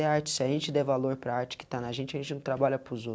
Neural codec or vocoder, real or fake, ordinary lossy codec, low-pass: none; real; none; none